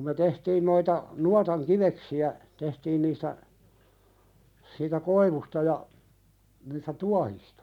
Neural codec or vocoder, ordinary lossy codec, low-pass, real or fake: none; none; 19.8 kHz; real